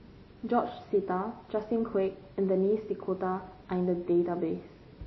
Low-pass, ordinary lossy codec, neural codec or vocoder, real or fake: 7.2 kHz; MP3, 24 kbps; none; real